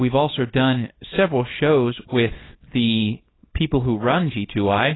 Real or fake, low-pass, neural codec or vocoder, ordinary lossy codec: fake; 7.2 kHz; codec, 24 kHz, 0.9 kbps, WavTokenizer, small release; AAC, 16 kbps